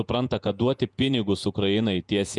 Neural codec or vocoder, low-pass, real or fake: vocoder, 48 kHz, 128 mel bands, Vocos; 10.8 kHz; fake